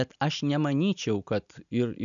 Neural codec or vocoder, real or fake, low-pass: none; real; 7.2 kHz